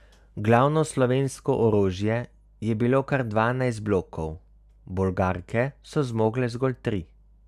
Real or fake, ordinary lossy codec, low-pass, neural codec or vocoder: real; none; 14.4 kHz; none